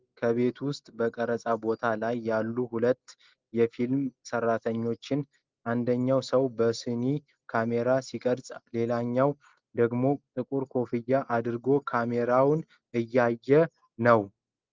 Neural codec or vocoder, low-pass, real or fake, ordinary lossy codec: none; 7.2 kHz; real; Opus, 32 kbps